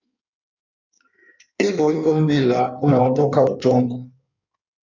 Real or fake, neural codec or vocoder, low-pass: fake; codec, 16 kHz in and 24 kHz out, 1.1 kbps, FireRedTTS-2 codec; 7.2 kHz